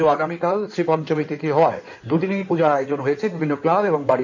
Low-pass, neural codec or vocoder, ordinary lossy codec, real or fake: 7.2 kHz; codec, 16 kHz in and 24 kHz out, 2.2 kbps, FireRedTTS-2 codec; none; fake